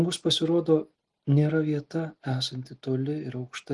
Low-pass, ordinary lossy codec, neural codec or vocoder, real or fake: 10.8 kHz; Opus, 24 kbps; none; real